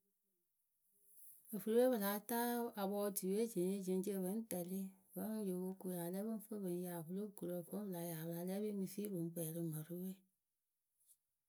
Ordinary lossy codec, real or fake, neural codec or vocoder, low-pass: none; real; none; none